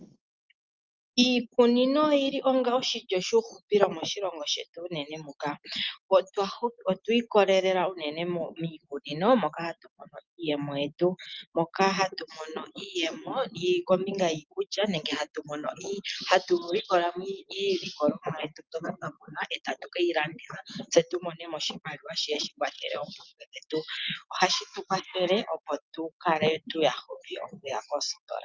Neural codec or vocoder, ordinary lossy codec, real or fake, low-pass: none; Opus, 24 kbps; real; 7.2 kHz